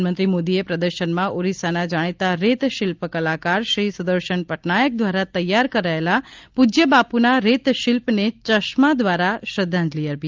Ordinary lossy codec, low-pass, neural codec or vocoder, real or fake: Opus, 32 kbps; 7.2 kHz; none; real